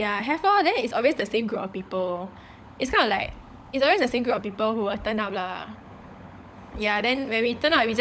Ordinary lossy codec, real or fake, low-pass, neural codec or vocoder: none; fake; none; codec, 16 kHz, 16 kbps, FunCodec, trained on LibriTTS, 50 frames a second